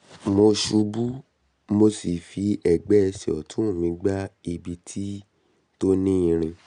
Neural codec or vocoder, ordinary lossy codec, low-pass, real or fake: none; none; 9.9 kHz; real